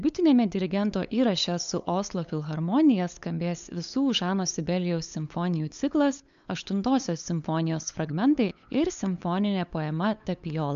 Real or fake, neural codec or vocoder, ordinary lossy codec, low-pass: fake; codec, 16 kHz, 8 kbps, FunCodec, trained on LibriTTS, 25 frames a second; MP3, 64 kbps; 7.2 kHz